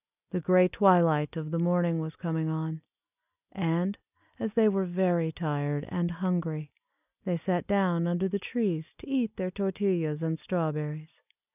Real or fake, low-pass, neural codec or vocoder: real; 3.6 kHz; none